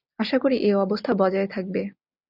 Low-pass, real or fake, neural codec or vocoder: 5.4 kHz; real; none